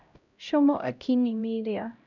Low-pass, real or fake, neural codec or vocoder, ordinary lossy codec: 7.2 kHz; fake; codec, 16 kHz, 0.5 kbps, X-Codec, HuBERT features, trained on LibriSpeech; none